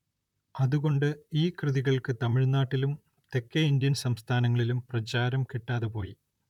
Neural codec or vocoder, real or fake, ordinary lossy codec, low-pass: vocoder, 44.1 kHz, 128 mel bands, Pupu-Vocoder; fake; none; 19.8 kHz